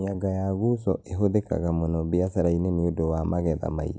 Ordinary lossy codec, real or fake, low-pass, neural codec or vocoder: none; real; none; none